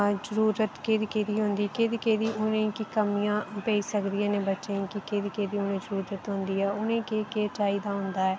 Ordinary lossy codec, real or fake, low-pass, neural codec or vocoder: none; real; none; none